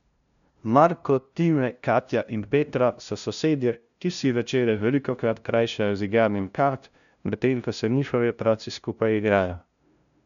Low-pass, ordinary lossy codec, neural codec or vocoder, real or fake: 7.2 kHz; none; codec, 16 kHz, 0.5 kbps, FunCodec, trained on LibriTTS, 25 frames a second; fake